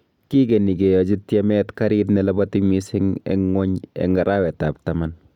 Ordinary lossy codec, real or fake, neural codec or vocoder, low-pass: none; real; none; 19.8 kHz